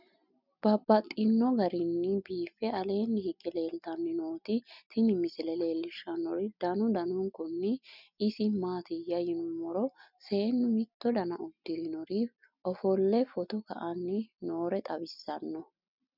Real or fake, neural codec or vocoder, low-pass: real; none; 5.4 kHz